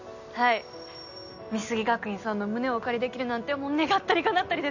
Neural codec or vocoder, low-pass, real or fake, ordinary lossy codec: none; 7.2 kHz; real; none